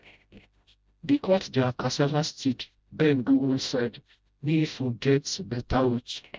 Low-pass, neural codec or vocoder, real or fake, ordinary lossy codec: none; codec, 16 kHz, 0.5 kbps, FreqCodec, smaller model; fake; none